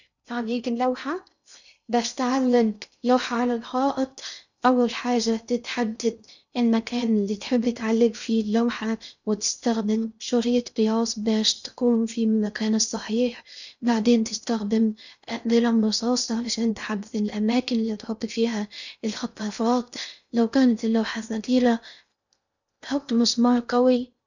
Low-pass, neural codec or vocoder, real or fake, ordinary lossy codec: 7.2 kHz; codec, 16 kHz in and 24 kHz out, 0.6 kbps, FocalCodec, streaming, 2048 codes; fake; none